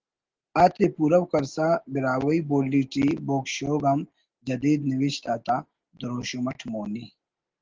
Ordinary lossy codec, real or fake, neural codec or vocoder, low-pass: Opus, 16 kbps; real; none; 7.2 kHz